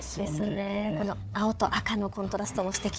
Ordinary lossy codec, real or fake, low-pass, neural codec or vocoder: none; fake; none; codec, 16 kHz, 4 kbps, FunCodec, trained on Chinese and English, 50 frames a second